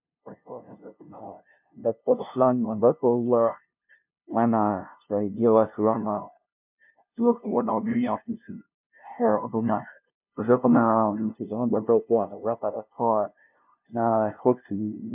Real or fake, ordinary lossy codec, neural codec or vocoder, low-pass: fake; MP3, 32 kbps; codec, 16 kHz, 0.5 kbps, FunCodec, trained on LibriTTS, 25 frames a second; 3.6 kHz